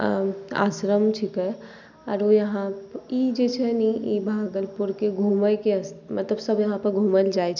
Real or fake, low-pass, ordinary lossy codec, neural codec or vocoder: real; 7.2 kHz; none; none